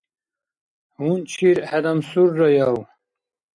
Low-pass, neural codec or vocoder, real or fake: 9.9 kHz; none; real